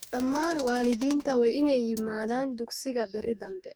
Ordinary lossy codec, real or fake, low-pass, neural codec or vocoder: none; fake; none; codec, 44.1 kHz, 2.6 kbps, DAC